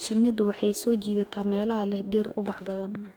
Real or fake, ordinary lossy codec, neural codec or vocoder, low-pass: fake; none; codec, 44.1 kHz, 2.6 kbps, DAC; none